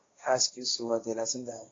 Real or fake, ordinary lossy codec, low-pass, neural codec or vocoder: fake; AAC, 32 kbps; 7.2 kHz; codec, 16 kHz, 1.1 kbps, Voila-Tokenizer